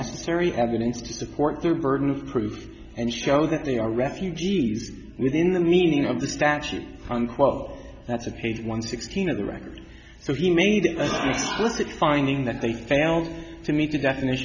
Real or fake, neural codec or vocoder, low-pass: real; none; 7.2 kHz